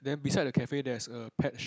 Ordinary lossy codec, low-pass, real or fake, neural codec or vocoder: none; none; real; none